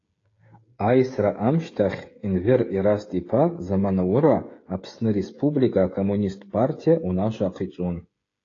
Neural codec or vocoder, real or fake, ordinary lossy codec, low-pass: codec, 16 kHz, 16 kbps, FreqCodec, smaller model; fake; AAC, 32 kbps; 7.2 kHz